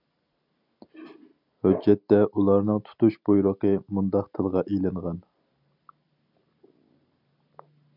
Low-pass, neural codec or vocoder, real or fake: 5.4 kHz; none; real